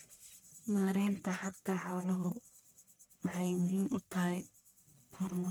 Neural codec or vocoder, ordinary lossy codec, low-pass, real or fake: codec, 44.1 kHz, 1.7 kbps, Pupu-Codec; none; none; fake